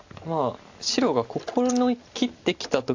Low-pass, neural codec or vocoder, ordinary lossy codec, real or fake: 7.2 kHz; none; AAC, 48 kbps; real